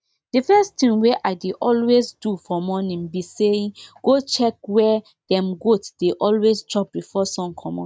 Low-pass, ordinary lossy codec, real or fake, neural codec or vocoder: none; none; real; none